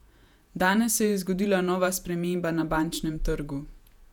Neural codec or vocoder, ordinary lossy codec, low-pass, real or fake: vocoder, 48 kHz, 128 mel bands, Vocos; none; 19.8 kHz; fake